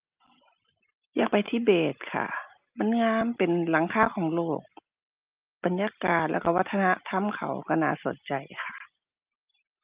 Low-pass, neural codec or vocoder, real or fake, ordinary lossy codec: 3.6 kHz; none; real; Opus, 32 kbps